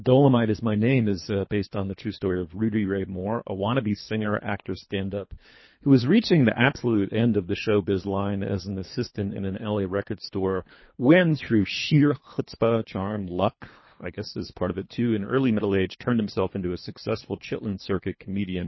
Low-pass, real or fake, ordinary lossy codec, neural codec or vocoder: 7.2 kHz; fake; MP3, 24 kbps; codec, 24 kHz, 3 kbps, HILCodec